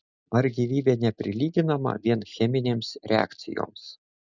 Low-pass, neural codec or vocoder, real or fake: 7.2 kHz; none; real